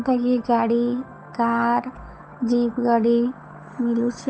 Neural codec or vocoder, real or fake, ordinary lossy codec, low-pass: codec, 16 kHz, 8 kbps, FunCodec, trained on Chinese and English, 25 frames a second; fake; none; none